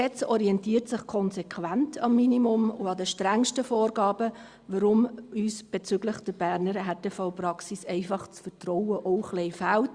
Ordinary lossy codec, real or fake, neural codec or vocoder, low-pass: Opus, 64 kbps; real; none; 9.9 kHz